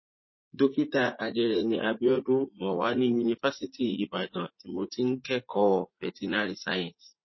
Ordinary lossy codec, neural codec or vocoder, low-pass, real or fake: MP3, 24 kbps; vocoder, 44.1 kHz, 80 mel bands, Vocos; 7.2 kHz; fake